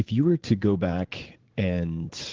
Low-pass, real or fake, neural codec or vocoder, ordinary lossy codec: 7.2 kHz; fake; vocoder, 44.1 kHz, 80 mel bands, Vocos; Opus, 16 kbps